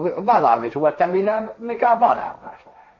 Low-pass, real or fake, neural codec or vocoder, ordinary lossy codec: 7.2 kHz; fake; codec, 16 kHz, 1.1 kbps, Voila-Tokenizer; MP3, 32 kbps